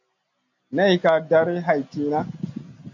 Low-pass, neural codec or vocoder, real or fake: 7.2 kHz; none; real